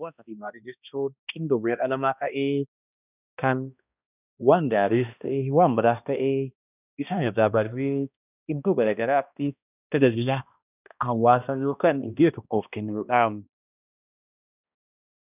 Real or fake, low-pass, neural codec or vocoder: fake; 3.6 kHz; codec, 16 kHz, 1 kbps, X-Codec, HuBERT features, trained on balanced general audio